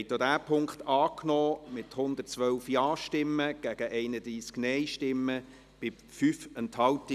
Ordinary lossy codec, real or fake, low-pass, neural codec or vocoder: none; real; 14.4 kHz; none